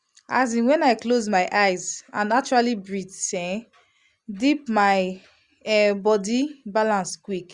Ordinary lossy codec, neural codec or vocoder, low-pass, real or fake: Opus, 64 kbps; none; 10.8 kHz; real